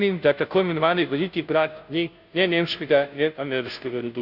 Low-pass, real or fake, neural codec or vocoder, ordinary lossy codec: 5.4 kHz; fake; codec, 16 kHz, 0.5 kbps, FunCodec, trained on Chinese and English, 25 frames a second; none